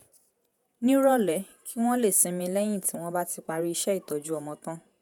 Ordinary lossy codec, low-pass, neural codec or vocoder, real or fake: none; none; vocoder, 48 kHz, 128 mel bands, Vocos; fake